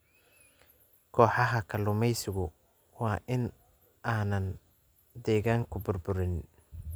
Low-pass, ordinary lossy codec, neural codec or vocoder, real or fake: none; none; none; real